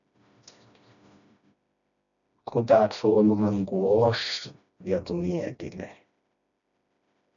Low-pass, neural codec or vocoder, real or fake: 7.2 kHz; codec, 16 kHz, 1 kbps, FreqCodec, smaller model; fake